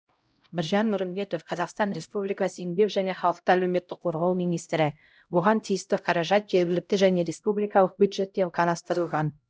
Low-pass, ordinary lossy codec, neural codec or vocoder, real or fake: none; none; codec, 16 kHz, 0.5 kbps, X-Codec, HuBERT features, trained on LibriSpeech; fake